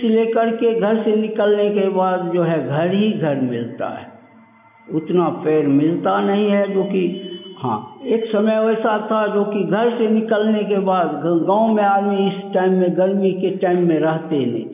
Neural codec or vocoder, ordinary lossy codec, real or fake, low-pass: none; none; real; 3.6 kHz